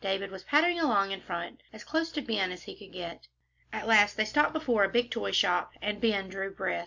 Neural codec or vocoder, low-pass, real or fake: none; 7.2 kHz; real